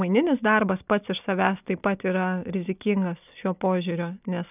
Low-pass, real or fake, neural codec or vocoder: 3.6 kHz; real; none